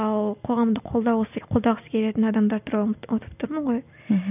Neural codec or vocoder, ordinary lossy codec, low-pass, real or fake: none; none; 3.6 kHz; real